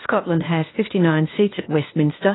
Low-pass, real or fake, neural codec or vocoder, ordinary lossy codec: 7.2 kHz; fake; codec, 16 kHz, 0.8 kbps, ZipCodec; AAC, 16 kbps